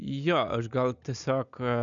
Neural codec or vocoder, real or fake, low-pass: none; real; 7.2 kHz